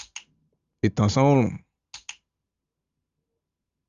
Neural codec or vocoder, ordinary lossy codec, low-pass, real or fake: none; Opus, 32 kbps; 7.2 kHz; real